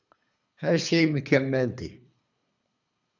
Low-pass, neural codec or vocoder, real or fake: 7.2 kHz; codec, 24 kHz, 3 kbps, HILCodec; fake